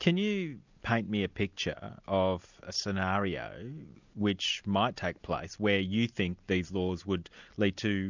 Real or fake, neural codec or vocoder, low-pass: real; none; 7.2 kHz